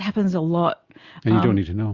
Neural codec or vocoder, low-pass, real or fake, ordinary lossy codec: none; 7.2 kHz; real; Opus, 64 kbps